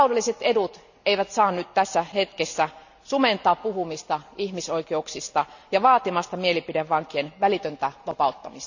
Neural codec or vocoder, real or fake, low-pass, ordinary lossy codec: none; real; 7.2 kHz; none